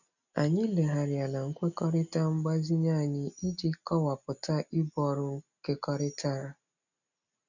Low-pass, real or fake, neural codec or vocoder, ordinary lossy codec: 7.2 kHz; real; none; none